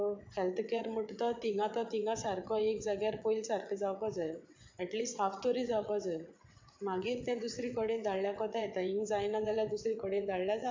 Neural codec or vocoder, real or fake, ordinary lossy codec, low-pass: vocoder, 22.05 kHz, 80 mel bands, WaveNeXt; fake; MP3, 64 kbps; 7.2 kHz